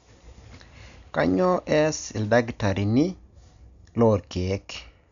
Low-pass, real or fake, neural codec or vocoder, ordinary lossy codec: 7.2 kHz; real; none; none